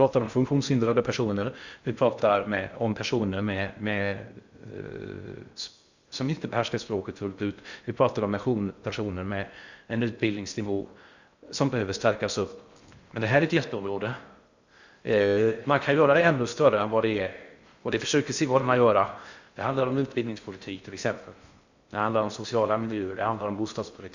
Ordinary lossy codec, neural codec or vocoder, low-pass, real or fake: Opus, 64 kbps; codec, 16 kHz in and 24 kHz out, 0.6 kbps, FocalCodec, streaming, 2048 codes; 7.2 kHz; fake